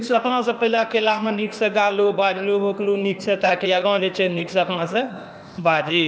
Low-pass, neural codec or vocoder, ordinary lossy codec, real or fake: none; codec, 16 kHz, 0.8 kbps, ZipCodec; none; fake